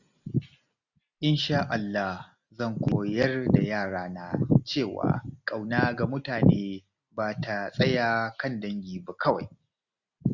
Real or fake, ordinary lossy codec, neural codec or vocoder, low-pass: real; none; none; 7.2 kHz